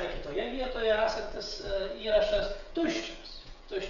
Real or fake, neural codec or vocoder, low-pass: fake; codec, 16 kHz, 16 kbps, FreqCodec, smaller model; 7.2 kHz